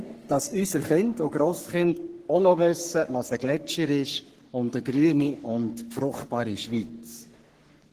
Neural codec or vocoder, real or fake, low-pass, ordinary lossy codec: codec, 44.1 kHz, 3.4 kbps, Pupu-Codec; fake; 14.4 kHz; Opus, 16 kbps